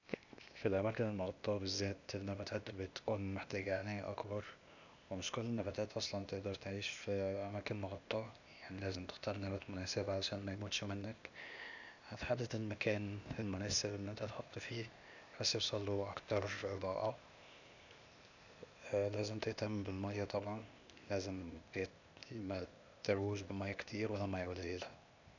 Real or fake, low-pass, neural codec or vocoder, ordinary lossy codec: fake; 7.2 kHz; codec, 16 kHz, 0.8 kbps, ZipCodec; none